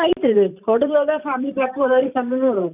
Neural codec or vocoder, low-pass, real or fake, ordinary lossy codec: vocoder, 44.1 kHz, 128 mel bands every 512 samples, BigVGAN v2; 3.6 kHz; fake; none